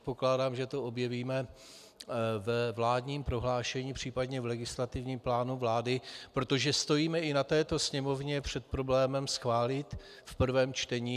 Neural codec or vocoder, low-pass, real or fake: none; 14.4 kHz; real